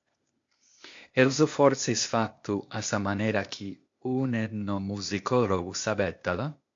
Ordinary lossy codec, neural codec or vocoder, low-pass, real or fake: MP3, 48 kbps; codec, 16 kHz, 0.8 kbps, ZipCodec; 7.2 kHz; fake